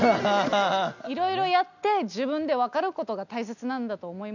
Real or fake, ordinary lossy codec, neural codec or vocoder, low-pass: real; none; none; 7.2 kHz